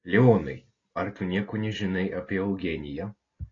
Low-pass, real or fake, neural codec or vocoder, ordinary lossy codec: 7.2 kHz; real; none; AAC, 32 kbps